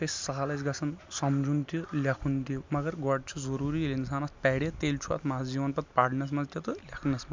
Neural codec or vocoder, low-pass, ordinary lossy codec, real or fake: none; 7.2 kHz; MP3, 64 kbps; real